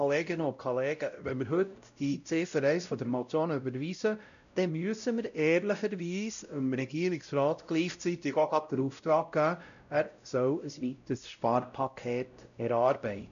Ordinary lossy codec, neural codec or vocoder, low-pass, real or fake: none; codec, 16 kHz, 0.5 kbps, X-Codec, WavLM features, trained on Multilingual LibriSpeech; 7.2 kHz; fake